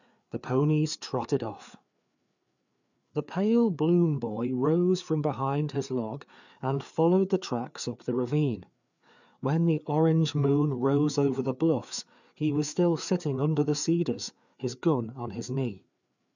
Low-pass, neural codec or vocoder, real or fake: 7.2 kHz; codec, 16 kHz, 4 kbps, FreqCodec, larger model; fake